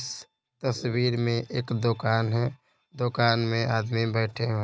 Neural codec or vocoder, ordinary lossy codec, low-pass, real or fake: none; none; none; real